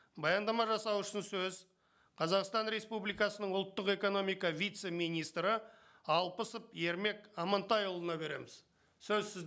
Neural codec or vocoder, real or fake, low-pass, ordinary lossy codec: none; real; none; none